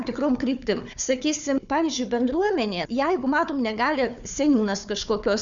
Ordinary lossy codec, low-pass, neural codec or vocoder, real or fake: Opus, 64 kbps; 7.2 kHz; codec, 16 kHz, 4 kbps, FunCodec, trained on Chinese and English, 50 frames a second; fake